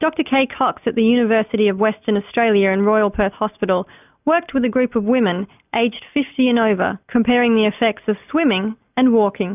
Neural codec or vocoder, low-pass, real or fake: none; 3.6 kHz; real